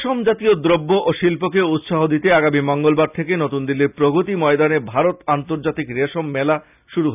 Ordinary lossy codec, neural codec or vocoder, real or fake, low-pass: none; none; real; 3.6 kHz